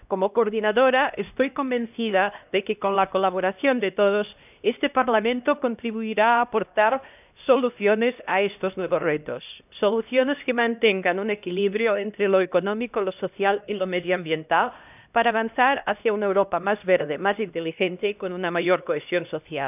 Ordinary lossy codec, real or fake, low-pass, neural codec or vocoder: none; fake; 3.6 kHz; codec, 16 kHz, 1 kbps, X-Codec, HuBERT features, trained on LibriSpeech